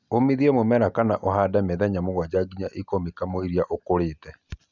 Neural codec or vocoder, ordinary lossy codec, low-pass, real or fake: none; none; 7.2 kHz; real